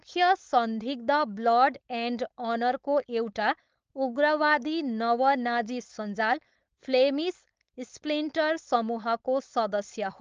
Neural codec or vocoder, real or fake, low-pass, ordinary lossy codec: codec, 16 kHz, 4.8 kbps, FACodec; fake; 7.2 kHz; Opus, 24 kbps